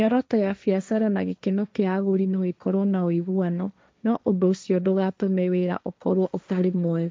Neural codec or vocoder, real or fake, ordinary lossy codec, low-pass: codec, 16 kHz, 1.1 kbps, Voila-Tokenizer; fake; none; none